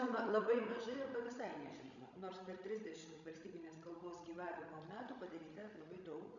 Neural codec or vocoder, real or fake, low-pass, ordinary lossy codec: codec, 16 kHz, 16 kbps, FreqCodec, larger model; fake; 7.2 kHz; MP3, 96 kbps